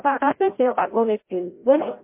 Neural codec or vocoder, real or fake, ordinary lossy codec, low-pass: codec, 16 kHz, 0.5 kbps, FreqCodec, larger model; fake; MP3, 24 kbps; 3.6 kHz